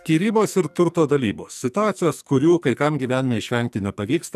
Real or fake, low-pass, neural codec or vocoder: fake; 14.4 kHz; codec, 44.1 kHz, 2.6 kbps, SNAC